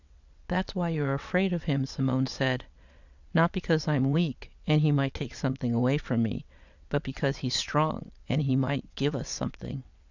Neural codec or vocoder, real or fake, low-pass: vocoder, 22.05 kHz, 80 mel bands, WaveNeXt; fake; 7.2 kHz